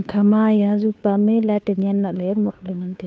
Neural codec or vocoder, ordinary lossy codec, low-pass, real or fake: codec, 16 kHz, 2 kbps, FunCodec, trained on Chinese and English, 25 frames a second; none; none; fake